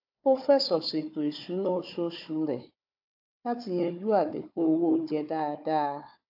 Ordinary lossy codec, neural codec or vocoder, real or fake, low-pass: AAC, 48 kbps; codec, 16 kHz, 4 kbps, FunCodec, trained on Chinese and English, 50 frames a second; fake; 5.4 kHz